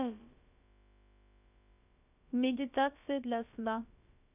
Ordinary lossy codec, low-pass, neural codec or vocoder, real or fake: none; 3.6 kHz; codec, 16 kHz, about 1 kbps, DyCAST, with the encoder's durations; fake